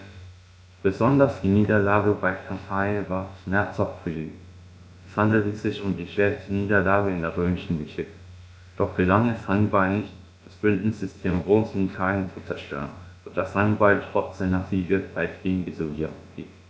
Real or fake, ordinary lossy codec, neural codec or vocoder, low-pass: fake; none; codec, 16 kHz, about 1 kbps, DyCAST, with the encoder's durations; none